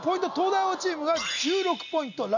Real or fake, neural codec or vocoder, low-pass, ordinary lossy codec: real; none; 7.2 kHz; none